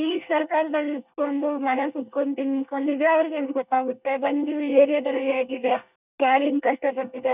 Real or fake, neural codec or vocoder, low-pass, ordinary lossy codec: fake; codec, 24 kHz, 1 kbps, SNAC; 3.6 kHz; none